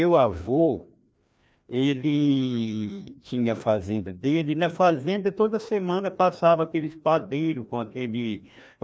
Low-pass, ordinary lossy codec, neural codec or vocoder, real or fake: none; none; codec, 16 kHz, 1 kbps, FreqCodec, larger model; fake